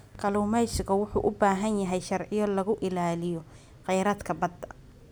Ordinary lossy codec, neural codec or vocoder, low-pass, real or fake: none; none; none; real